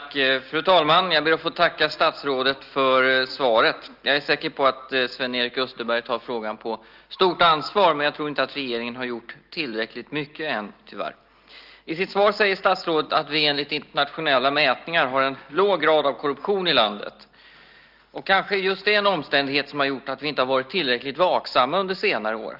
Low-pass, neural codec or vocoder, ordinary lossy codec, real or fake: 5.4 kHz; none; Opus, 32 kbps; real